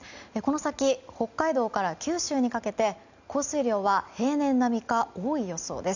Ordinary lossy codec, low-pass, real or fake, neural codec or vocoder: none; 7.2 kHz; real; none